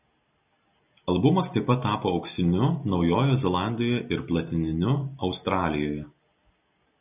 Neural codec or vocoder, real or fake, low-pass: none; real; 3.6 kHz